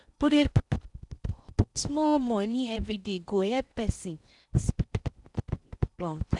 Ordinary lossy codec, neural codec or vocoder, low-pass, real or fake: none; codec, 16 kHz in and 24 kHz out, 0.8 kbps, FocalCodec, streaming, 65536 codes; 10.8 kHz; fake